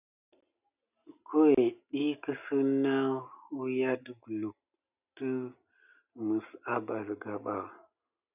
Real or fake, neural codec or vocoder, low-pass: real; none; 3.6 kHz